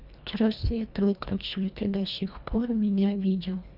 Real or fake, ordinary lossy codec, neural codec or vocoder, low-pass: fake; none; codec, 24 kHz, 1.5 kbps, HILCodec; 5.4 kHz